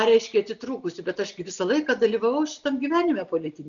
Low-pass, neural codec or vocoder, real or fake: 7.2 kHz; none; real